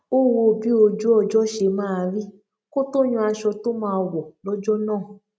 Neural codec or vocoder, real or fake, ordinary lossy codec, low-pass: none; real; none; none